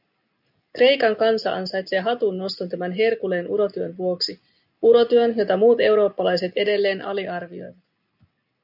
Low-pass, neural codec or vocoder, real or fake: 5.4 kHz; none; real